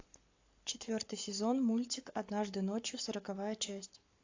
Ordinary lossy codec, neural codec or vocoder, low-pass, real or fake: AAC, 48 kbps; codec, 44.1 kHz, 7.8 kbps, Pupu-Codec; 7.2 kHz; fake